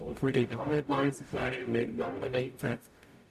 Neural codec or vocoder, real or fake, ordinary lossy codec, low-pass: codec, 44.1 kHz, 0.9 kbps, DAC; fake; none; 14.4 kHz